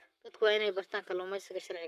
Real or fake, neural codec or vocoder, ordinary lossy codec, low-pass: fake; codec, 44.1 kHz, 7.8 kbps, Pupu-Codec; none; 14.4 kHz